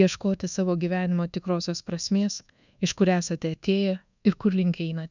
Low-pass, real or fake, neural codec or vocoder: 7.2 kHz; fake; codec, 24 kHz, 1.2 kbps, DualCodec